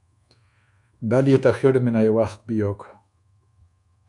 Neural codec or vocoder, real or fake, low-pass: codec, 24 kHz, 1.2 kbps, DualCodec; fake; 10.8 kHz